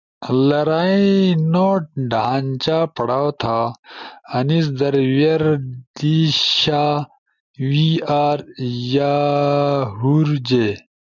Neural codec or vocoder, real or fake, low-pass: none; real; 7.2 kHz